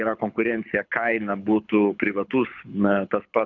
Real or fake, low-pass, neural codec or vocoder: fake; 7.2 kHz; codec, 24 kHz, 6 kbps, HILCodec